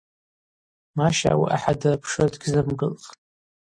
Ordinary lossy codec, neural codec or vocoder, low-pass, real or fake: MP3, 48 kbps; none; 9.9 kHz; real